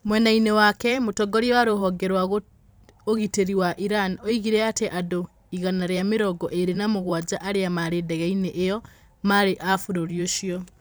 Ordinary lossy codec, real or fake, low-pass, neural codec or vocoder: none; fake; none; vocoder, 44.1 kHz, 128 mel bands every 512 samples, BigVGAN v2